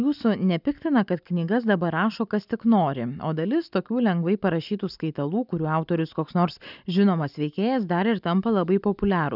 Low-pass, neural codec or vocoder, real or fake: 5.4 kHz; none; real